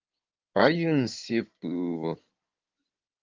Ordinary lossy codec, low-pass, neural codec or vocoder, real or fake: Opus, 32 kbps; 7.2 kHz; codec, 16 kHz in and 24 kHz out, 2.2 kbps, FireRedTTS-2 codec; fake